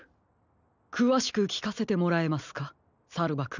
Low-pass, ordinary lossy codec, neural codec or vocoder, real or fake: 7.2 kHz; none; none; real